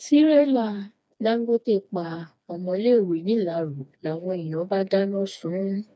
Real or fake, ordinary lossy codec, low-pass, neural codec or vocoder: fake; none; none; codec, 16 kHz, 2 kbps, FreqCodec, smaller model